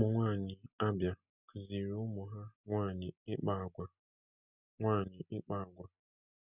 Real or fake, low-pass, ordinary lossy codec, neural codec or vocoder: real; 3.6 kHz; none; none